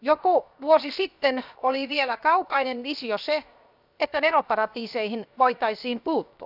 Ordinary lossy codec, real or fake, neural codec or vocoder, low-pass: none; fake; codec, 16 kHz, 0.7 kbps, FocalCodec; 5.4 kHz